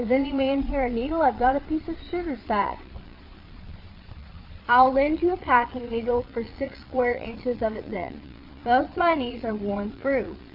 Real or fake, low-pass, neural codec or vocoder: fake; 5.4 kHz; vocoder, 22.05 kHz, 80 mel bands, Vocos